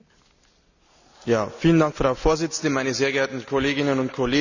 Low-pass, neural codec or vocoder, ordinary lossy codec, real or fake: 7.2 kHz; none; none; real